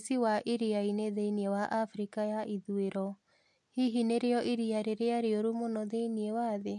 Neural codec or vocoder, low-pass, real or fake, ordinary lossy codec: none; 10.8 kHz; real; MP3, 64 kbps